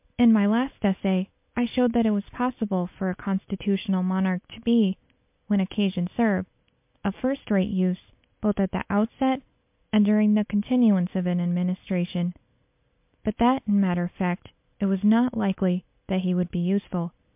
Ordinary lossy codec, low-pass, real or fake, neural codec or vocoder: MP3, 32 kbps; 3.6 kHz; real; none